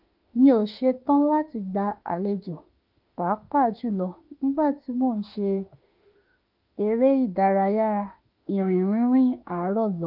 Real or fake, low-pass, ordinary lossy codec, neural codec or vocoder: fake; 5.4 kHz; Opus, 24 kbps; autoencoder, 48 kHz, 32 numbers a frame, DAC-VAE, trained on Japanese speech